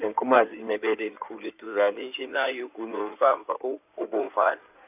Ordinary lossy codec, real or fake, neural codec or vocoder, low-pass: none; fake; codec, 16 kHz in and 24 kHz out, 2.2 kbps, FireRedTTS-2 codec; 3.6 kHz